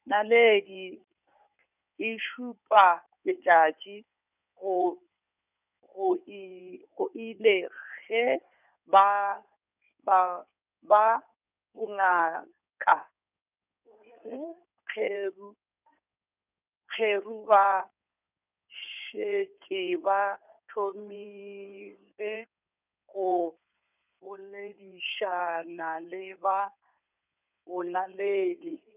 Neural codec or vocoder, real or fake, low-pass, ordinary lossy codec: codec, 16 kHz in and 24 kHz out, 2.2 kbps, FireRedTTS-2 codec; fake; 3.6 kHz; none